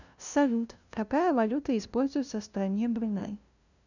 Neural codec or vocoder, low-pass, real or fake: codec, 16 kHz, 0.5 kbps, FunCodec, trained on LibriTTS, 25 frames a second; 7.2 kHz; fake